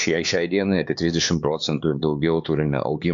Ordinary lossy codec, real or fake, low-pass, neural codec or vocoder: AAC, 64 kbps; fake; 7.2 kHz; codec, 16 kHz, 4 kbps, X-Codec, HuBERT features, trained on LibriSpeech